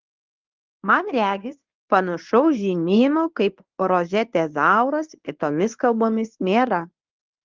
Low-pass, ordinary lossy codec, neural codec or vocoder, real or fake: 7.2 kHz; Opus, 32 kbps; codec, 24 kHz, 0.9 kbps, WavTokenizer, medium speech release version 1; fake